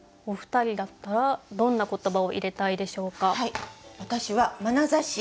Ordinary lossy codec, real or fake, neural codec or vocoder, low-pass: none; real; none; none